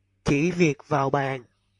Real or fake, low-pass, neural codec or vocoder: fake; 10.8 kHz; vocoder, 44.1 kHz, 128 mel bands, Pupu-Vocoder